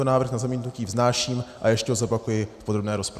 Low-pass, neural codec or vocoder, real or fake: 14.4 kHz; none; real